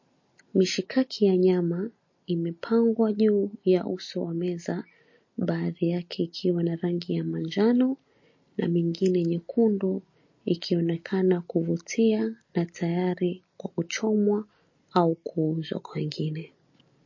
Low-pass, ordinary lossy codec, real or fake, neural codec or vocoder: 7.2 kHz; MP3, 32 kbps; real; none